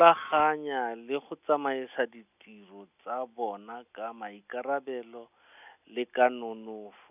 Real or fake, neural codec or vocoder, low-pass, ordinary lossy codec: real; none; 3.6 kHz; none